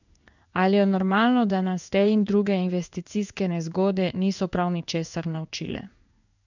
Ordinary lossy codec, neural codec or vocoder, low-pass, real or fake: none; codec, 16 kHz in and 24 kHz out, 1 kbps, XY-Tokenizer; 7.2 kHz; fake